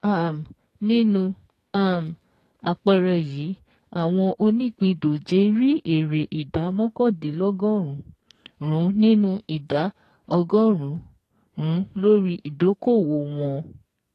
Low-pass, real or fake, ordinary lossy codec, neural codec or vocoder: 14.4 kHz; fake; AAC, 48 kbps; codec, 44.1 kHz, 2.6 kbps, SNAC